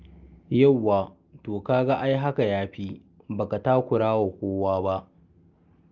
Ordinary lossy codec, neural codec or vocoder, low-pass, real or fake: Opus, 32 kbps; none; 7.2 kHz; real